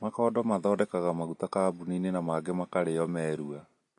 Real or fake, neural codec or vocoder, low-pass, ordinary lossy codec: real; none; 10.8 kHz; MP3, 48 kbps